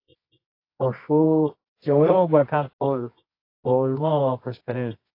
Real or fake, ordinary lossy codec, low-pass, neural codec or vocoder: fake; AAC, 32 kbps; 5.4 kHz; codec, 24 kHz, 0.9 kbps, WavTokenizer, medium music audio release